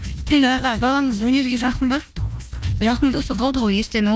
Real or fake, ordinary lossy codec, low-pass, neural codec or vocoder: fake; none; none; codec, 16 kHz, 1 kbps, FunCodec, trained on Chinese and English, 50 frames a second